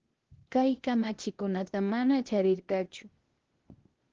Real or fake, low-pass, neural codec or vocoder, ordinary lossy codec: fake; 7.2 kHz; codec, 16 kHz, 0.8 kbps, ZipCodec; Opus, 16 kbps